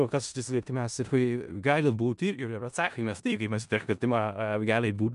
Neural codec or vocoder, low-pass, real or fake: codec, 16 kHz in and 24 kHz out, 0.4 kbps, LongCat-Audio-Codec, four codebook decoder; 10.8 kHz; fake